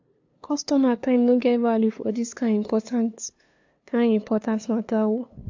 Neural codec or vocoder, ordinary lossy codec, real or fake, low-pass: codec, 16 kHz, 2 kbps, FunCodec, trained on LibriTTS, 25 frames a second; AAC, 48 kbps; fake; 7.2 kHz